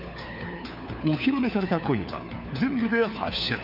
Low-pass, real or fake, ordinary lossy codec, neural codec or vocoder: 5.4 kHz; fake; none; codec, 16 kHz, 4 kbps, FunCodec, trained on LibriTTS, 50 frames a second